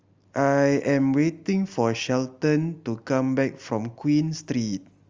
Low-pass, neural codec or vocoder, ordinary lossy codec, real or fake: 7.2 kHz; none; Opus, 32 kbps; real